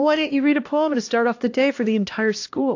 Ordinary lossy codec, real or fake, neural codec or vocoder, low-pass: AAC, 48 kbps; fake; codec, 16 kHz, 1 kbps, X-Codec, HuBERT features, trained on LibriSpeech; 7.2 kHz